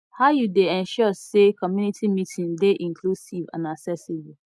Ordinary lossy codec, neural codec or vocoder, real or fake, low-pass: none; none; real; none